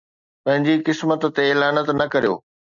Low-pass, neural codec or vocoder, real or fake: 7.2 kHz; none; real